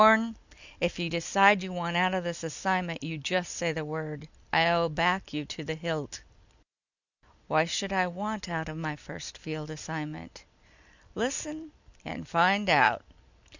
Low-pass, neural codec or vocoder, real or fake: 7.2 kHz; none; real